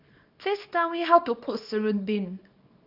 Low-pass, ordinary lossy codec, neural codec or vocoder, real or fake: 5.4 kHz; none; codec, 24 kHz, 0.9 kbps, WavTokenizer, small release; fake